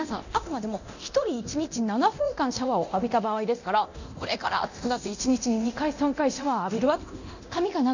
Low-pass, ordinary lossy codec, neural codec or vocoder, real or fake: 7.2 kHz; none; codec, 24 kHz, 0.9 kbps, DualCodec; fake